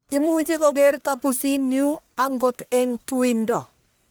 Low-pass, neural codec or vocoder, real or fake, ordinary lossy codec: none; codec, 44.1 kHz, 1.7 kbps, Pupu-Codec; fake; none